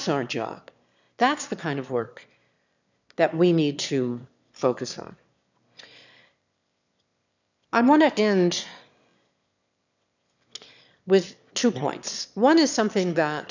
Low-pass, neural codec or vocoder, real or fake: 7.2 kHz; autoencoder, 22.05 kHz, a latent of 192 numbers a frame, VITS, trained on one speaker; fake